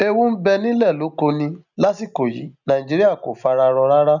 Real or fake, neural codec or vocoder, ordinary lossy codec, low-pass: real; none; none; 7.2 kHz